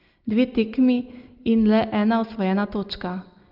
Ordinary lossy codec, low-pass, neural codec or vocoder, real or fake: Opus, 24 kbps; 5.4 kHz; none; real